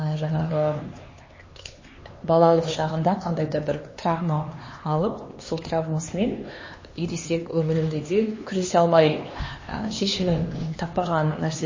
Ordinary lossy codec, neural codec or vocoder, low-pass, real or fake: MP3, 32 kbps; codec, 16 kHz, 2 kbps, X-Codec, HuBERT features, trained on LibriSpeech; 7.2 kHz; fake